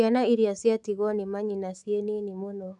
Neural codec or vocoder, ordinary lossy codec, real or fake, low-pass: autoencoder, 48 kHz, 128 numbers a frame, DAC-VAE, trained on Japanese speech; none; fake; 10.8 kHz